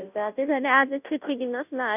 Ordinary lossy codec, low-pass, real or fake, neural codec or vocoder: none; 3.6 kHz; fake; codec, 16 kHz, 0.5 kbps, FunCodec, trained on Chinese and English, 25 frames a second